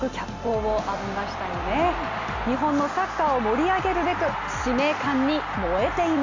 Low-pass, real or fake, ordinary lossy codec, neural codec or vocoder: 7.2 kHz; real; MP3, 64 kbps; none